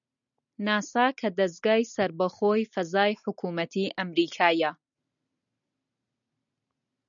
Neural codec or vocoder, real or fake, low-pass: none; real; 7.2 kHz